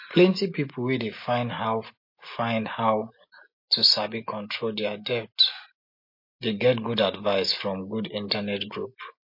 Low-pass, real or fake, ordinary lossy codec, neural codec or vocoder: 5.4 kHz; real; MP3, 32 kbps; none